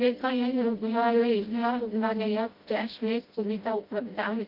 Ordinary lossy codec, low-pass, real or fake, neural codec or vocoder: Opus, 24 kbps; 5.4 kHz; fake; codec, 16 kHz, 0.5 kbps, FreqCodec, smaller model